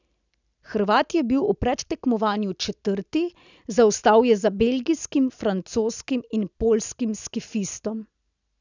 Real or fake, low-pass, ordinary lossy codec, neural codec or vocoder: real; 7.2 kHz; none; none